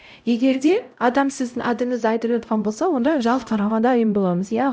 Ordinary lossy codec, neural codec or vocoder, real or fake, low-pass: none; codec, 16 kHz, 0.5 kbps, X-Codec, HuBERT features, trained on LibriSpeech; fake; none